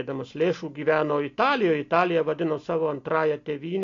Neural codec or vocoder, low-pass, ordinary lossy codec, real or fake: none; 7.2 kHz; AAC, 48 kbps; real